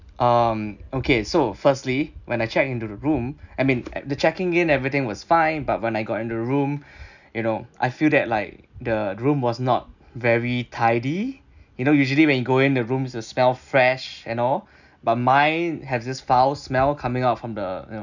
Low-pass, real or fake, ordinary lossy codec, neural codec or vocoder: 7.2 kHz; real; none; none